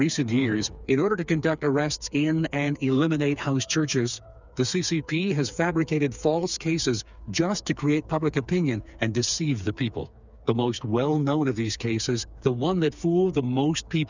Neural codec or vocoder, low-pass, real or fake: codec, 16 kHz, 4 kbps, FreqCodec, smaller model; 7.2 kHz; fake